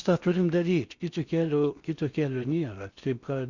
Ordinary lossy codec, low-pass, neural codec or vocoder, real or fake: Opus, 64 kbps; 7.2 kHz; codec, 16 kHz in and 24 kHz out, 0.6 kbps, FocalCodec, streaming, 4096 codes; fake